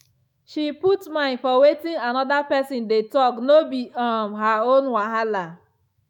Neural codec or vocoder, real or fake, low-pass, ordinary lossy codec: autoencoder, 48 kHz, 128 numbers a frame, DAC-VAE, trained on Japanese speech; fake; 19.8 kHz; none